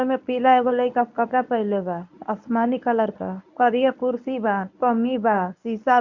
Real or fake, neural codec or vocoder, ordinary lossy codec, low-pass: fake; codec, 24 kHz, 0.9 kbps, WavTokenizer, medium speech release version 1; none; 7.2 kHz